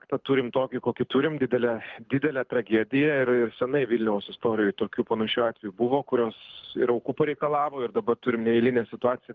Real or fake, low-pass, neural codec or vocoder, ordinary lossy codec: fake; 7.2 kHz; codec, 24 kHz, 6 kbps, HILCodec; Opus, 24 kbps